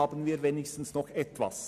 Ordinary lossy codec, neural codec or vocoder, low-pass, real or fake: none; none; 14.4 kHz; real